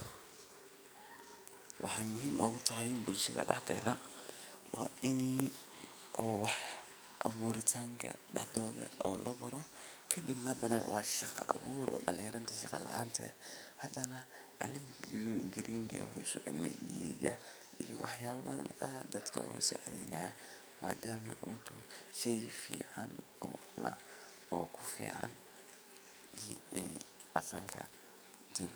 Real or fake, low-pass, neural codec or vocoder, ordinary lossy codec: fake; none; codec, 44.1 kHz, 2.6 kbps, SNAC; none